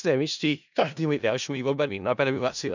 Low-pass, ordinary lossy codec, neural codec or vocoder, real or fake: 7.2 kHz; none; codec, 16 kHz in and 24 kHz out, 0.4 kbps, LongCat-Audio-Codec, four codebook decoder; fake